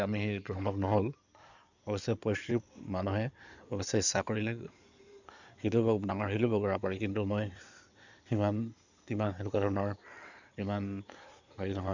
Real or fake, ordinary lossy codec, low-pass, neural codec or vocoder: fake; none; 7.2 kHz; codec, 16 kHz in and 24 kHz out, 2.2 kbps, FireRedTTS-2 codec